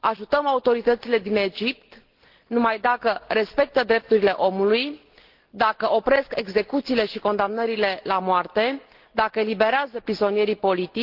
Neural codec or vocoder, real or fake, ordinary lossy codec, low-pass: none; real; Opus, 16 kbps; 5.4 kHz